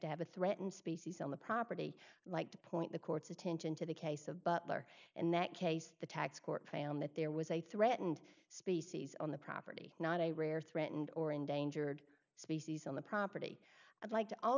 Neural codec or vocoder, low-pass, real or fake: none; 7.2 kHz; real